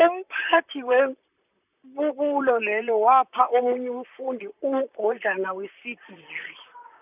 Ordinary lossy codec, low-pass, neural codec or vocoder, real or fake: none; 3.6 kHz; vocoder, 44.1 kHz, 128 mel bands, Pupu-Vocoder; fake